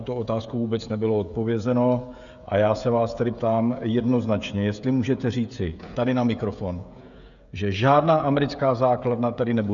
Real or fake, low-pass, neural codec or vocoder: fake; 7.2 kHz; codec, 16 kHz, 16 kbps, FreqCodec, smaller model